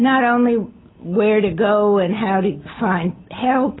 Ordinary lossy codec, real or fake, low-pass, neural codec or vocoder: AAC, 16 kbps; real; 7.2 kHz; none